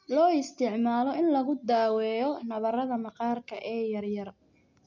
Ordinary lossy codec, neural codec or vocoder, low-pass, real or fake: none; none; 7.2 kHz; real